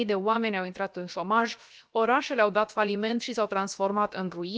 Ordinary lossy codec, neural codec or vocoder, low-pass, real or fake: none; codec, 16 kHz, 0.7 kbps, FocalCodec; none; fake